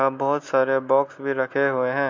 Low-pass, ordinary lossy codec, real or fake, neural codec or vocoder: 7.2 kHz; MP3, 48 kbps; real; none